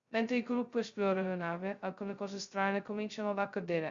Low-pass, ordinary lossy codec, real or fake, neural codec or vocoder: 7.2 kHz; Opus, 64 kbps; fake; codec, 16 kHz, 0.2 kbps, FocalCodec